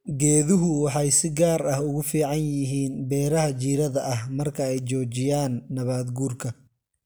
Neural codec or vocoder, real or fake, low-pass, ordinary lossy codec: none; real; none; none